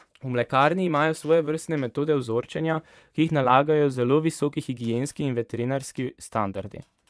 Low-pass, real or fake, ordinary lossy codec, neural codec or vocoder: none; fake; none; vocoder, 22.05 kHz, 80 mel bands, Vocos